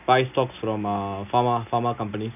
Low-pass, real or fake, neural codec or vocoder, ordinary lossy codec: 3.6 kHz; real; none; none